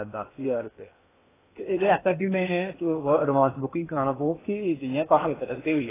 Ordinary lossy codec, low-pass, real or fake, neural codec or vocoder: AAC, 16 kbps; 3.6 kHz; fake; codec, 16 kHz in and 24 kHz out, 0.8 kbps, FocalCodec, streaming, 65536 codes